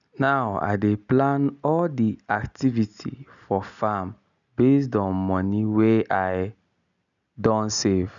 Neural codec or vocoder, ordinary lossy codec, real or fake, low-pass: none; none; real; 7.2 kHz